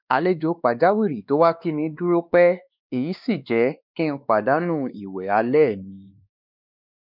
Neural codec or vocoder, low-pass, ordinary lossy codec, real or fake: codec, 16 kHz, 2 kbps, X-Codec, WavLM features, trained on Multilingual LibriSpeech; 5.4 kHz; none; fake